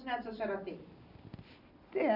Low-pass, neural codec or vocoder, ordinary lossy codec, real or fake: 5.4 kHz; none; none; real